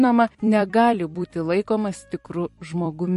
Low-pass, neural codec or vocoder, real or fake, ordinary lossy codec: 14.4 kHz; vocoder, 44.1 kHz, 128 mel bands every 256 samples, BigVGAN v2; fake; MP3, 48 kbps